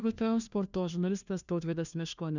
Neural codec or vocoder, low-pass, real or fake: codec, 16 kHz, 1 kbps, FunCodec, trained on LibriTTS, 50 frames a second; 7.2 kHz; fake